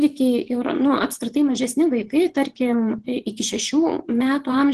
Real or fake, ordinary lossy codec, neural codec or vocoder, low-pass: fake; Opus, 16 kbps; vocoder, 24 kHz, 100 mel bands, Vocos; 10.8 kHz